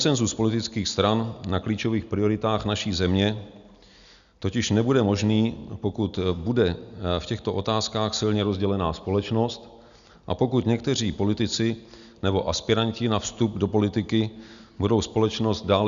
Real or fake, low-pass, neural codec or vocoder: real; 7.2 kHz; none